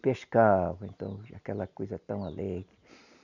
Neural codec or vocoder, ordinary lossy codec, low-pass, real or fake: none; none; 7.2 kHz; real